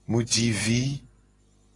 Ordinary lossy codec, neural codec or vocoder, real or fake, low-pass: AAC, 32 kbps; none; real; 10.8 kHz